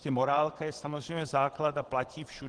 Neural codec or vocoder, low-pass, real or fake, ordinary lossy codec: vocoder, 22.05 kHz, 80 mel bands, WaveNeXt; 9.9 kHz; fake; Opus, 16 kbps